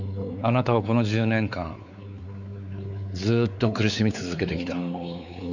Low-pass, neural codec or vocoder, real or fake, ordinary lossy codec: 7.2 kHz; codec, 16 kHz, 4 kbps, X-Codec, WavLM features, trained on Multilingual LibriSpeech; fake; none